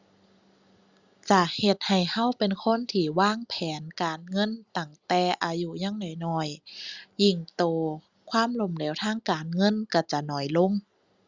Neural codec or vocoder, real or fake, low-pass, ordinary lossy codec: none; real; 7.2 kHz; Opus, 64 kbps